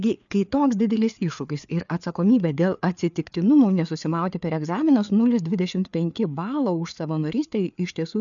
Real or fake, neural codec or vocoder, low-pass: fake; codec, 16 kHz, 4 kbps, FreqCodec, larger model; 7.2 kHz